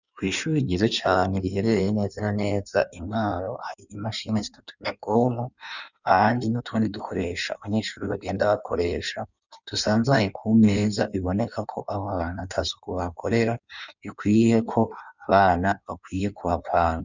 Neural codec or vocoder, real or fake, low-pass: codec, 16 kHz in and 24 kHz out, 1.1 kbps, FireRedTTS-2 codec; fake; 7.2 kHz